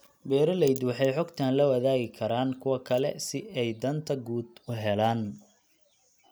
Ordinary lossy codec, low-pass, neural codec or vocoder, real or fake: none; none; none; real